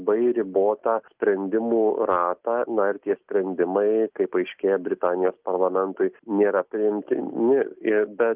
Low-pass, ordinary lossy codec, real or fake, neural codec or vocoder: 3.6 kHz; Opus, 32 kbps; real; none